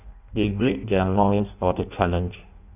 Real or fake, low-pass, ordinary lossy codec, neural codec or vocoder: fake; 3.6 kHz; none; codec, 16 kHz in and 24 kHz out, 0.6 kbps, FireRedTTS-2 codec